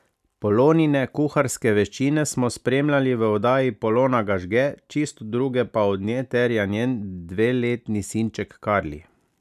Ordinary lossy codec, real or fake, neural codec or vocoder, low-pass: none; real; none; 14.4 kHz